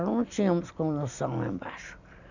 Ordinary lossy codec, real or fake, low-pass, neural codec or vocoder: none; real; 7.2 kHz; none